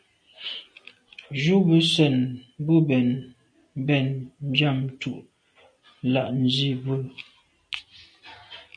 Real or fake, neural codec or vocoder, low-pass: real; none; 9.9 kHz